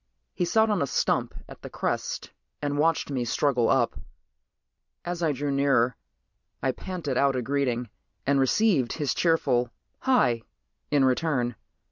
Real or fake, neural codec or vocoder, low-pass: real; none; 7.2 kHz